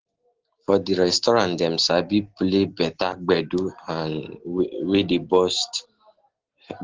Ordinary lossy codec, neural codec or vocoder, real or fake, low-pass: Opus, 16 kbps; none; real; 7.2 kHz